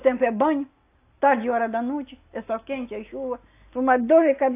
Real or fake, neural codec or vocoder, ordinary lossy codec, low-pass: real; none; AAC, 24 kbps; 3.6 kHz